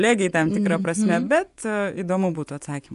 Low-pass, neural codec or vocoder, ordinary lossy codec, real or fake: 10.8 kHz; vocoder, 24 kHz, 100 mel bands, Vocos; MP3, 96 kbps; fake